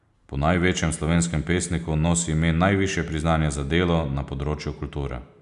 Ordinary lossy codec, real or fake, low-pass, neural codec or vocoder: none; real; 10.8 kHz; none